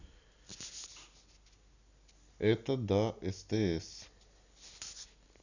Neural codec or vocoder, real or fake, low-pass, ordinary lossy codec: none; real; 7.2 kHz; none